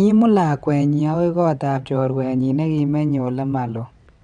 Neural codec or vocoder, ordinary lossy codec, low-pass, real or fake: vocoder, 22.05 kHz, 80 mel bands, WaveNeXt; MP3, 96 kbps; 9.9 kHz; fake